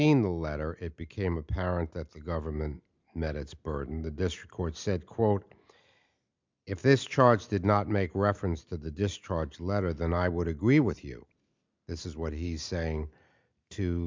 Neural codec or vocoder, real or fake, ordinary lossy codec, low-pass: none; real; MP3, 64 kbps; 7.2 kHz